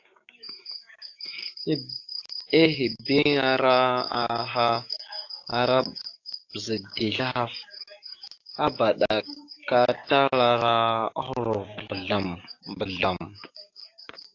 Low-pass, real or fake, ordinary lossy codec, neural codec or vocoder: 7.2 kHz; fake; AAC, 48 kbps; codec, 16 kHz, 6 kbps, DAC